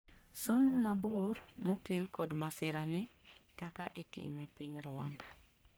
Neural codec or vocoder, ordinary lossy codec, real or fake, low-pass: codec, 44.1 kHz, 1.7 kbps, Pupu-Codec; none; fake; none